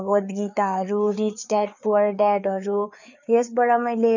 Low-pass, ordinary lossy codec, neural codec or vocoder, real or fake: 7.2 kHz; none; codec, 16 kHz, 4 kbps, FreqCodec, larger model; fake